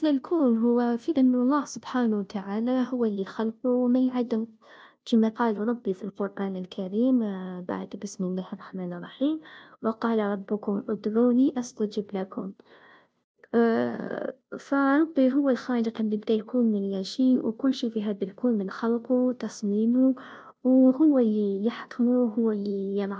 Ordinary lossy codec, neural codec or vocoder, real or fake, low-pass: none; codec, 16 kHz, 0.5 kbps, FunCodec, trained on Chinese and English, 25 frames a second; fake; none